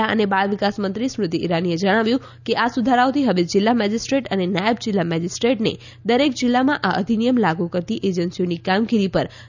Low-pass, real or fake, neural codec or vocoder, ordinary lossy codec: 7.2 kHz; real; none; none